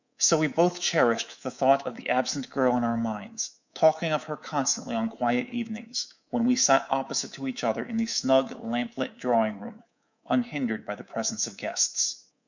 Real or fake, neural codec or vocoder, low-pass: fake; codec, 24 kHz, 3.1 kbps, DualCodec; 7.2 kHz